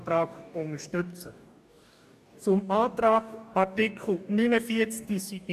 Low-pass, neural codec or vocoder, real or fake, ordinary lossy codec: 14.4 kHz; codec, 44.1 kHz, 2.6 kbps, DAC; fake; none